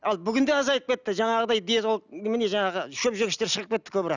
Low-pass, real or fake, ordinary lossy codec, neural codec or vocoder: 7.2 kHz; real; none; none